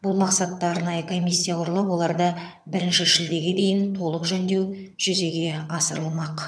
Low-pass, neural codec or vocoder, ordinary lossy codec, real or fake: none; vocoder, 22.05 kHz, 80 mel bands, HiFi-GAN; none; fake